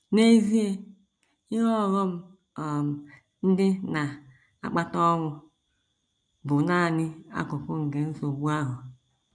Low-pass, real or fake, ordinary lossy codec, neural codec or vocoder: 9.9 kHz; real; none; none